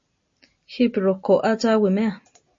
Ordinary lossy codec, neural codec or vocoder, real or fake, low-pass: MP3, 32 kbps; none; real; 7.2 kHz